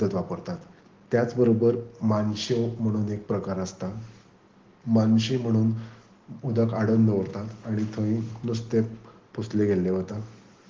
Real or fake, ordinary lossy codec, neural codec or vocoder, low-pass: real; Opus, 16 kbps; none; 7.2 kHz